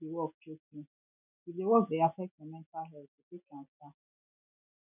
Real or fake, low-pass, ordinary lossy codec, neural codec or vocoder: real; 3.6 kHz; none; none